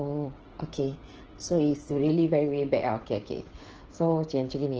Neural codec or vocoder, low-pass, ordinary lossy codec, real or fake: codec, 16 kHz, 16 kbps, FreqCodec, smaller model; 7.2 kHz; Opus, 16 kbps; fake